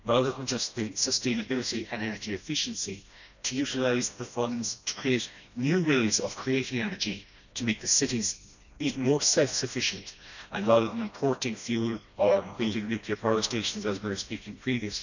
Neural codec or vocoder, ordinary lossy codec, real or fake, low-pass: codec, 16 kHz, 1 kbps, FreqCodec, smaller model; none; fake; 7.2 kHz